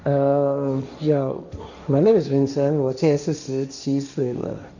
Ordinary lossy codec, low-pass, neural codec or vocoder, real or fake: none; 7.2 kHz; codec, 16 kHz, 1.1 kbps, Voila-Tokenizer; fake